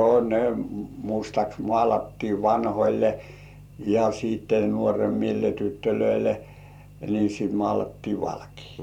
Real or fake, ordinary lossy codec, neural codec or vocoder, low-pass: fake; none; vocoder, 44.1 kHz, 128 mel bands every 512 samples, BigVGAN v2; 19.8 kHz